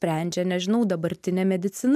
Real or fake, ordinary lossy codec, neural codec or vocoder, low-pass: real; MP3, 96 kbps; none; 14.4 kHz